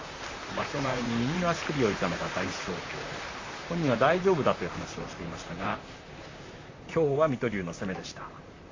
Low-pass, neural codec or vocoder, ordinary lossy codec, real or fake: 7.2 kHz; vocoder, 44.1 kHz, 128 mel bands, Pupu-Vocoder; AAC, 32 kbps; fake